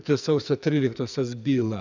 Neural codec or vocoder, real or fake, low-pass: codec, 44.1 kHz, 2.6 kbps, SNAC; fake; 7.2 kHz